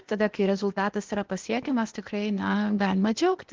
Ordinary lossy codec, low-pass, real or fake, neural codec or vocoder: Opus, 16 kbps; 7.2 kHz; fake; codec, 16 kHz, 0.8 kbps, ZipCodec